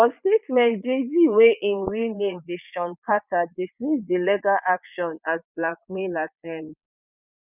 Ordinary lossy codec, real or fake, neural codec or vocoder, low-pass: none; fake; vocoder, 22.05 kHz, 80 mel bands, Vocos; 3.6 kHz